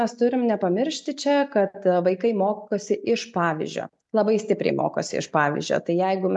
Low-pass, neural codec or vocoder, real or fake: 10.8 kHz; none; real